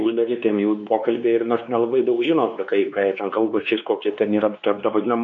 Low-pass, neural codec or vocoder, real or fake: 7.2 kHz; codec, 16 kHz, 2 kbps, X-Codec, WavLM features, trained on Multilingual LibriSpeech; fake